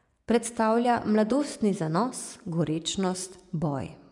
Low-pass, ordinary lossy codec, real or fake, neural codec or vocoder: 10.8 kHz; none; fake; vocoder, 24 kHz, 100 mel bands, Vocos